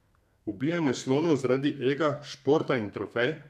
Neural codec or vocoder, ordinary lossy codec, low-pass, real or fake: codec, 32 kHz, 1.9 kbps, SNAC; none; 14.4 kHz; fake